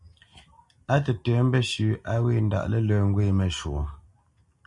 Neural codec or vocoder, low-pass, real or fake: none; 10.8 kHz; real